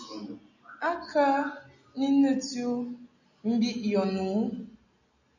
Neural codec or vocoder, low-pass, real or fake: none; 7.2 kHz; real